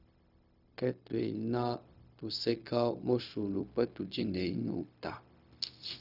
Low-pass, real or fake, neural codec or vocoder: 5.4 kHz; fake; codec, 16 kHz, 0.4 kbps, LongCat-Audio-Codec